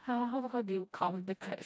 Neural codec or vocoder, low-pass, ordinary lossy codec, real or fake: codec, 16 kHz, 1 kbps, FreqCodec, smaller model; none; none; fake